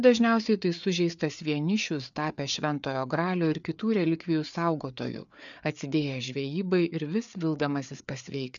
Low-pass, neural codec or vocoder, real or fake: 7.2 kHz; codec, 16 kHz, 4 kbps, FreqCodec, larger model; fake